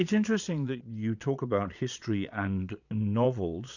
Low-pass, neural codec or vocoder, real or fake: 7.2 kHz; vocoder, 22.05 kHz, 80 mel bands, Vocos; fake